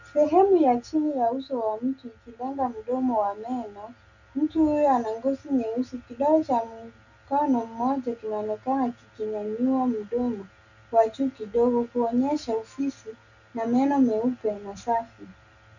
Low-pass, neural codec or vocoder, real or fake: 7.2 kHz; none; real